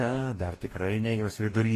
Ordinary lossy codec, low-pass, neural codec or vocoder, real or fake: AAC, 48 kbps; 14.4 kHz; codec, 44.1 kHz, 2.6 kbps, DAC; fake